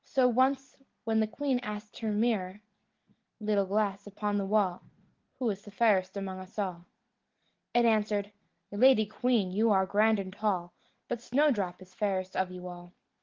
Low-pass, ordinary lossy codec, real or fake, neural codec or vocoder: 7.2 kHz; Opus, 16 kbps; real; none